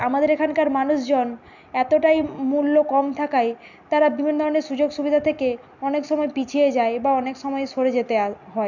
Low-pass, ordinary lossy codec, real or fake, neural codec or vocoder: 7.2 kHz; none; real; none